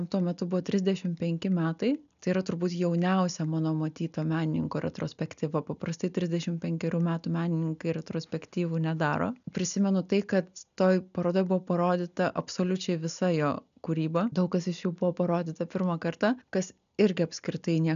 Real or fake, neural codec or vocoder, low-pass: real; none; 7.2 kHz